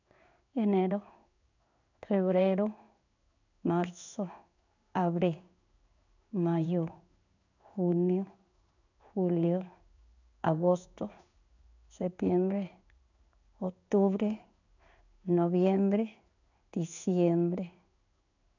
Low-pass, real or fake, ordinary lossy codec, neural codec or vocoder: 7.2 kHz; fake; none; codec, 16 kHz in and 24 kHz out, 1 kbps, XY-Tokenizer